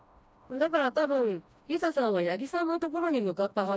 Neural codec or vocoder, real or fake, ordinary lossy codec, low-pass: codec, 16 kHz, 1 kbps, FreqCodec, smaller model; fake; none; none